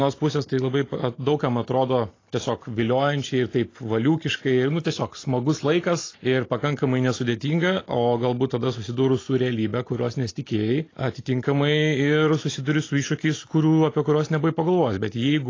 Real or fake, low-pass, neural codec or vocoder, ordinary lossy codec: real; 7.2 kHz; none; AAC, 32 kbps